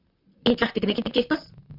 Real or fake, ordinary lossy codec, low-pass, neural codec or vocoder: fake; Opus, 64 kbps; 5.4 kHz; vocoder, 44.1 kHz, 128 mel bands, Pupu-Vocoder